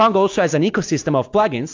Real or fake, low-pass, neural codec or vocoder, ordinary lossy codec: real; 7.2 kHz; none; AAC, 48 kbps